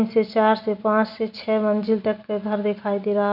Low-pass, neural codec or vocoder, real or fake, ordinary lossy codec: 5.4 kHz; none; real; none